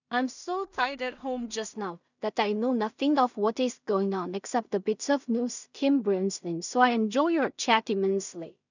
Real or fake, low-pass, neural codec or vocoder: fake; 7.2 kHz; codec, 16 kHz in and 24 kHz out, 0.4 kbps, LongCat-Audio-Codec, two codebook decoder